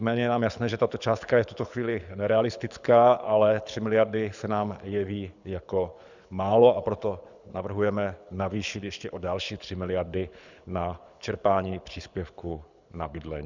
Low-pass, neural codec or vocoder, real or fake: 7.2 kHz; codec, 24 kHz, 6 kbps, HILCodec; fake